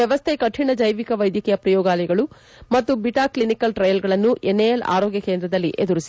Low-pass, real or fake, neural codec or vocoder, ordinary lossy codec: none; real; none; none